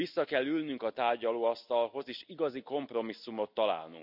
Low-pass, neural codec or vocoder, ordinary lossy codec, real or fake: 5.4 kHz; none; none; real